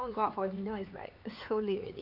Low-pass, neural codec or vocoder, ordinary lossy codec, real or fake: 5.4 kHz; codec, 16 kHz, 4 kbps, X-Codec, HuBERT features, trained on LibriSpeech; none; fake